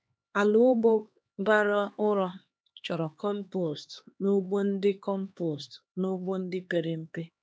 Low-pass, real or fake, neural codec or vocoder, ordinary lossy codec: none; fake; codec, 16 kHz, 2 kbps, X-Codec, HuBERT features, trained on LibriSpeech; none